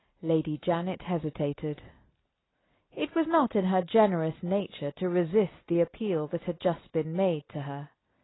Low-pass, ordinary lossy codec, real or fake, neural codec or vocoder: 7.2 kHz; AAC, 16 kbps; real; none